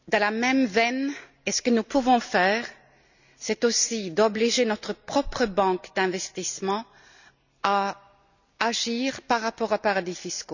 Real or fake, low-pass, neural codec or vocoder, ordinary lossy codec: real; 7.2 kHz; none; none